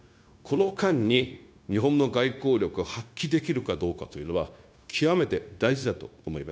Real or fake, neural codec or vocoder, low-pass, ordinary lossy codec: fake; codec, 16 kHz, 0.9 kbps, LongCat-Audio-Codec; none; none